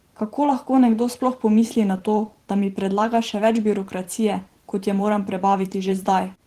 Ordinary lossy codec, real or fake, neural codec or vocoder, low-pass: Opus, 16 kbps; fake; vocoder, 44.1 kHz, 128 mel bands every 512 samples, BigVGAN v2; 14.4 kHz